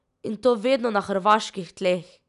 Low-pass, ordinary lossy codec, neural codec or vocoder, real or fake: 10.8 kHz; none; none; real